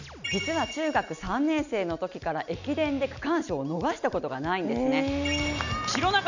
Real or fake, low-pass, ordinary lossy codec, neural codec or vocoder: real; 7.2 kHz; none; none